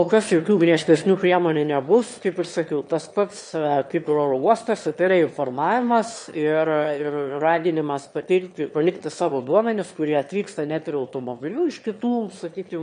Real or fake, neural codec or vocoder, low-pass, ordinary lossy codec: fake; autoencoder, 22.05 kHz, a latent of 192 numbers a frame, VITS, trained on one speaker; 9.9 kHz; MP3, 48 kbps